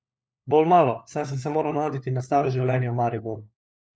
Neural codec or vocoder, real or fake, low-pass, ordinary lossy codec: codec, 16 kHz, 4 kbps, FunCodec, trained on LibriTTS, 50 frames a second; fake; none; none